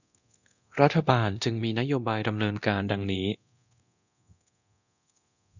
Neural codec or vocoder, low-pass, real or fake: codec, 24 kHz, 0.9 kbps, DualCodec; 7.2 kHz; fake